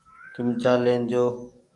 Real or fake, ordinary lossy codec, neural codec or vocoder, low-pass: fake; AAC, 64 kbps; codec, 44.1 kHz, 7.8 kbps, DAC; 10.8 kHz